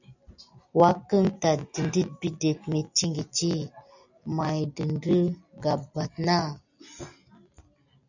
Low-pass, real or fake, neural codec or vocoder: 7.2 kHz; real; none